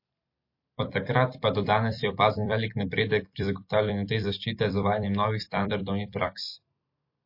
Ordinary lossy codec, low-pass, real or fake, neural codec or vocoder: MP3, 32 kbps; 5.4 kHz; fake; vocoder, 44.1 kHz, 128 mel bands every 256 samples, BigVGAN v2